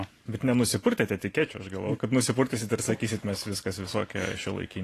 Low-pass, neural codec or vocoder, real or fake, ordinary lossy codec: 14.4 kHz; vocoder, 44.1 kHz, 128 mel bands every 512 samples, BigVGAN v2; fake; AAC, 48 kbps